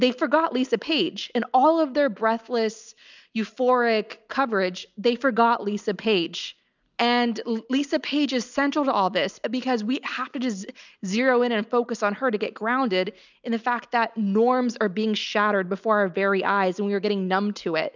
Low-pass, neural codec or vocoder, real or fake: 7.2 kHz; none; real